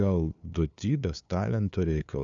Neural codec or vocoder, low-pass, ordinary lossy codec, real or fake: codec, 16 kHz, 2 kbps, FunCodec, trained on LibriTTS, 25 frames a second; 7.2 kHz; MP3, 96 kbps; fake